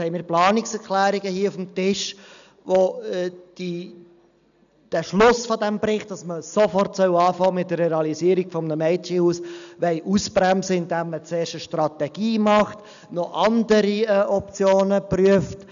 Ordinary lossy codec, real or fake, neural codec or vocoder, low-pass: none; real; none; 7.2 kHz